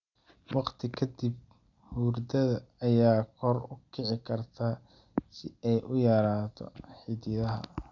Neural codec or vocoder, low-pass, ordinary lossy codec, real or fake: none; 7.2 kHz; none; real